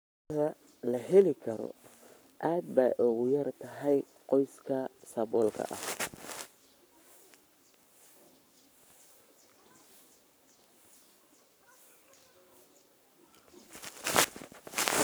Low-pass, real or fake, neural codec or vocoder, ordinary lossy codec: none; fake; codec, 44.1 kHz, 7.8 kbps, Pupu-Codec; none